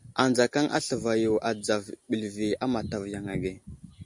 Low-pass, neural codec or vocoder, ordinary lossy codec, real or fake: 10.8 kHz; none; MP3, 64 kbps; real